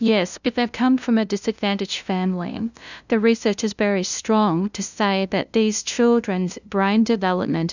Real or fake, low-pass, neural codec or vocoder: fake; 7.2 kHz; codec, 16 kHz, 0.5 kbps, FunCodec, trained on LibriTTS, 25 frames a second